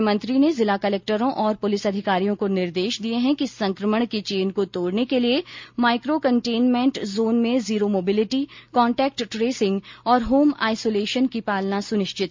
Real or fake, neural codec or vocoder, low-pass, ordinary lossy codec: real; none; 7.2 kHz; MP3, 48 kbps